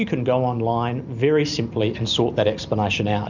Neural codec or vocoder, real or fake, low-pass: none; real; 7.2 kHz